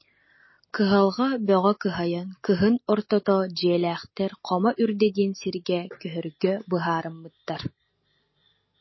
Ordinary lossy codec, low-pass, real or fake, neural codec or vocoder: MP3, 24 kbps; 7.2 kHz; real; none